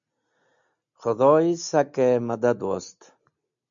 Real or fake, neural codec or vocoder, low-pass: real; none; 7.2 kHz